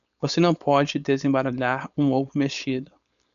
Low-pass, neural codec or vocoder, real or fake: 7.2 kHz; codec, 16 kHz, 4.8 kbps, FACodec; fake